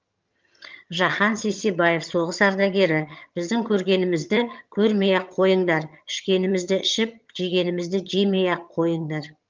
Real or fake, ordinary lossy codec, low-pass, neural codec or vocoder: fake; Opus, 24 kbps; 7.2 kHz; vocoder, 22.05 kHz, 80 mel bands, HiFi-GAN